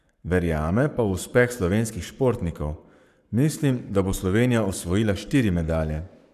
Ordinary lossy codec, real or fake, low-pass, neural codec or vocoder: none; fake; 14.4 kHz; codec, 44.1 kHz, 7.8 kbps, Pupu-Codec